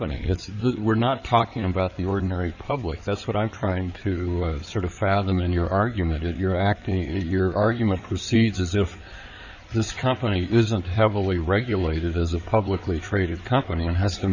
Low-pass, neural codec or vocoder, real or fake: 7.2 kHz; codec, 16 kHz in and 24 kHz out, 2.2 kbps, FireRedTTS-2 codec; fake